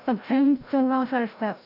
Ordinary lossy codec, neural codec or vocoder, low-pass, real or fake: none; codec, 16 kHz, 0.5 kbps, FreqCodec, larger model; 5.4 kHz; fake